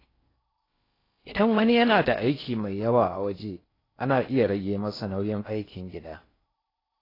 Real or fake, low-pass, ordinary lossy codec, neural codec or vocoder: fake; 5.4 kHz; AAC, 24 kbps; codec, 16 kHz in and 24 kHz out, 0.6 kbps, FocalCodec, streaming, 4096 codes